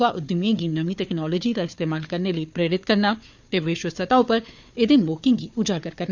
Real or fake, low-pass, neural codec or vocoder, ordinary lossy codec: fake; 7.2 kHz; codec, 16 kHz, 4 kbps, FunCodec, trained on Chinese and English, 50 frames a second; none